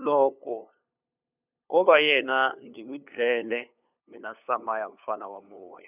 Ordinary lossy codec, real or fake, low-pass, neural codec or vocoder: none; fake; 3.6 kHz; codec, 16 kHz, 2 kbps, FunCodec, trained on LibriTTS, 25 frames a second